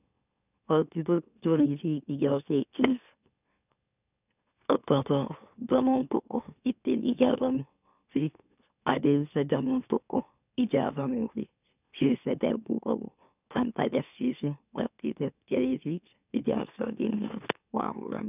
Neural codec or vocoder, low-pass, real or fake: autoencoder, 44.1 kHz, a latent of 192 numbers a frame, MeloTTS; 3.6 kHz; fake